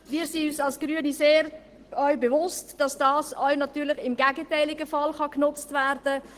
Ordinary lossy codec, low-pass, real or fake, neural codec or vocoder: Opus, 16 kbps; 14.4 kHz; real; none